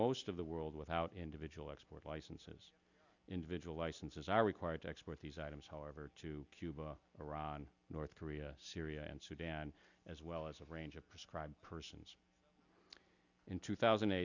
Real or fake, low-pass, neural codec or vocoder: real; 7.2 kHz; none